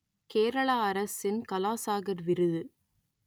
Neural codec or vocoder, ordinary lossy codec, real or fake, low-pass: none; none; real; none